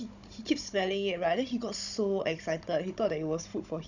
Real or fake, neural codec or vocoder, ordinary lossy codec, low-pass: fake; codec, 16 kHz, 16 kbps, FunCodec, trained on Chinese and English, 50 frames a second; none; 7.2 kHz